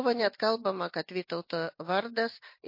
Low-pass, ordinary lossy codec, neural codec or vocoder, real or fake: 5.4 kHz; MP3, 32 kbps; none; real